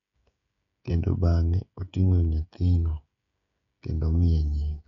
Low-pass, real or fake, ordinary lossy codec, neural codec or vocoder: 7.2 kHz; fake; MP3, 96 kbps; codec, 16 kHz, 16 kbps, FreqCodec, smaller model